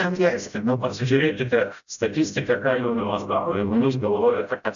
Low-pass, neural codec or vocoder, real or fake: 7.2 kHz; codec, 16 kHz, 0.5 kbps, FreqCodec, smaller model; fake